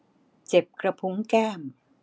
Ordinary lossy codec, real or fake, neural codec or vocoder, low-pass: none; real; none; none